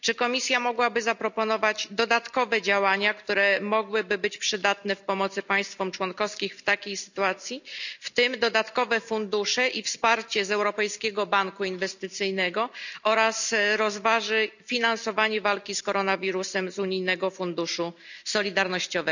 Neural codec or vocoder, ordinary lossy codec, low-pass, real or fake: none; none; 7.2 kHz; real